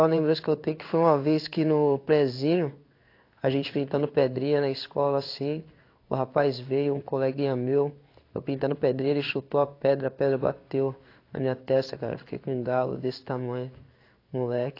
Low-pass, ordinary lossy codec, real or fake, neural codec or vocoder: 5.4 kHz; AAC, 32 kbps; fake; codec, 16 kHz in and 24 kHz out, 1 kbps, XY-Tokenizer